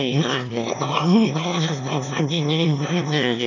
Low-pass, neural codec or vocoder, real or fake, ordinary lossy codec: 7.2 kHz; autoencoder, 22.05 kHz, a latent of 192 numbers a frame, VITS, trained on one speaker; fake; none